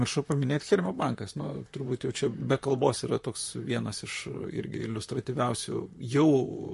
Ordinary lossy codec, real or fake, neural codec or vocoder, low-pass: MP3, 48 kbps; fake; vocoder, 44.1 kHz, 128 mel bands, Pupu-Vocoder; 14.4 kHz